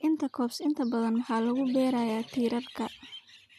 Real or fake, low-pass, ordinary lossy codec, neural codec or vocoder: real; 14.4 kHz; none; none